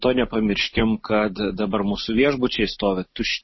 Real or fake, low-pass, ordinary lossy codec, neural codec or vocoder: real; 7.2 kHz; MP3, 24 kbps; none